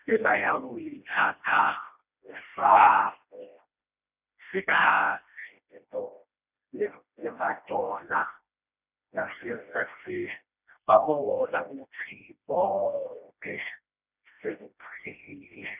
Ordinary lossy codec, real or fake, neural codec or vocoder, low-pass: none; fake; codec, 16 kHz, 1 kbps, FreqCodec, smaller model; 3.6 kHz